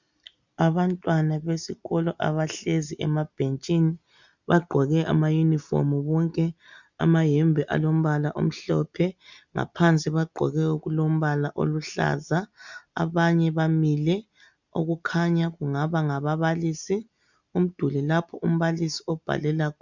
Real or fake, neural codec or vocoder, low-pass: real; none; 7.2 kHz